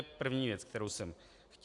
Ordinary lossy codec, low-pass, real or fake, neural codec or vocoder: AAC, 64 kbps; 10.8 kHz; real; none